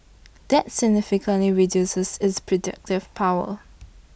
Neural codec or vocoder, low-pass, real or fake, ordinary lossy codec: none; none; real; none